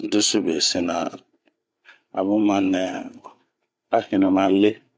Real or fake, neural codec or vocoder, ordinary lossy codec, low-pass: fake; codec, 16 kHz, 8 kbps, FreqCodec, larger model; none; none